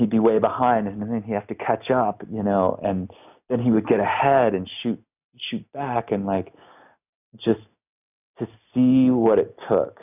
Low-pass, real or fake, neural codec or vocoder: 3.6 kHz; real; none